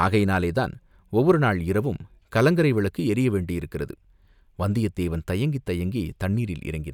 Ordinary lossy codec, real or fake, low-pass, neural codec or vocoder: none; real; 19.8 kHz; none